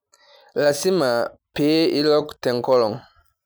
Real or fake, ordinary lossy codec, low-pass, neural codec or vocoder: real; none; none; none